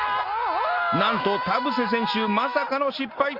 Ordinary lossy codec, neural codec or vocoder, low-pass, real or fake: Opus, 32 kbps; none; 5.4 kHz; real